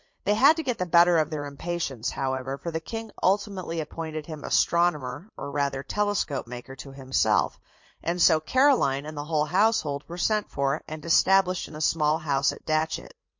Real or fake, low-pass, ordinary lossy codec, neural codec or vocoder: fake; 7.2 kHz; MP3, 48 kbps; vocoder, 44.1 kHz, 80 mel bands, Vocos